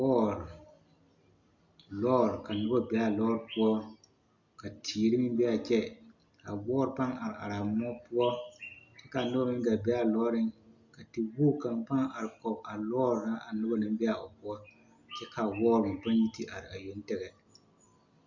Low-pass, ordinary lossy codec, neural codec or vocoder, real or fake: 7.2 kHz; Opus, 64 kbps; none; real